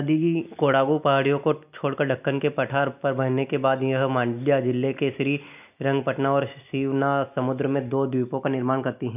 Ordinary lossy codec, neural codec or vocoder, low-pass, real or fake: none; none; 3.6 kHz; real